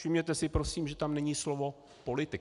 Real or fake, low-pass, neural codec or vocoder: real; 10.8 kHz; none